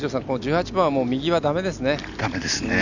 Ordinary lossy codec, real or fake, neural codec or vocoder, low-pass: none; real; none; 7.2 kHz